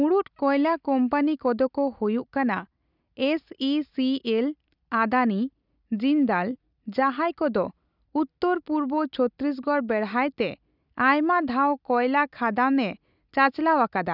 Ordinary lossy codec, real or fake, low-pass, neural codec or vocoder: none; real; 5.4 kHz; none